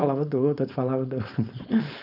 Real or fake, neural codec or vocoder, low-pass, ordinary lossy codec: fake; codec, 16 kHz, 4.8 kbps, FACodec; 5.4 kHz; none